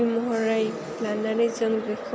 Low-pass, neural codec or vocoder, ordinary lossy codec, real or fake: none; none; none; real